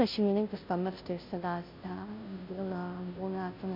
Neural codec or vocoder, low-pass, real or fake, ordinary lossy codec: codec, 16 kHz, 0.5 kbps, FunCodec, trained on Chinese and English, 25 frames a second; 5.4 kHz; fake; MP3, 48 kbps